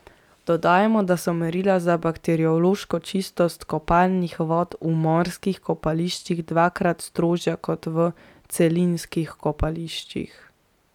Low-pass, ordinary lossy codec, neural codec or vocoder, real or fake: 19.8 kHz; none; none; real